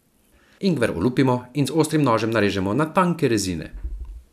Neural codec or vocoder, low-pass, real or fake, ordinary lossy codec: none; 14.4 kHz; real; none